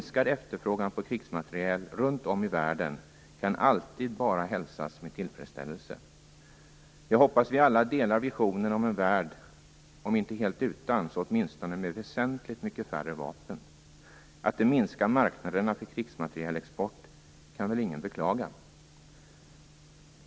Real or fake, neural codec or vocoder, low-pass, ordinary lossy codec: real; none; none; none